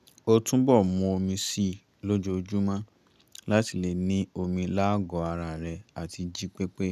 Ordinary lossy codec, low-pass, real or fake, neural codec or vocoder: none; 14.4 kHz; real; none